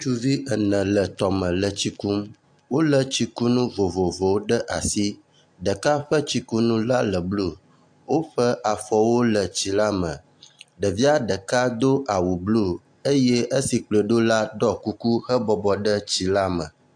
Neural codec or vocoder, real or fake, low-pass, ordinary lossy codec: none; real; 9.9 kHz; AAC, 64 kbps